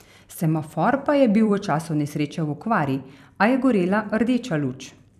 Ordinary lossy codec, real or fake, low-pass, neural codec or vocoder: none; fake; 14.4 kHz; vocoder, 44.1 kHz, 128 mel bands every 256 samples, BigVGAN v2